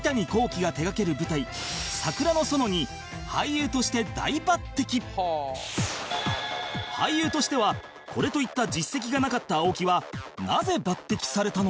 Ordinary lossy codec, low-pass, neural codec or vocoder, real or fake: none; none; none; real